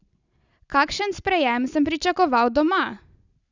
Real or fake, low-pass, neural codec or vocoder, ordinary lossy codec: real; 7.2 kHz; none; none